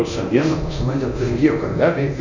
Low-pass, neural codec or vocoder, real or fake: 7.2 kHz; codec, 24 kHz, 0.9 kbps, DualCodec; fake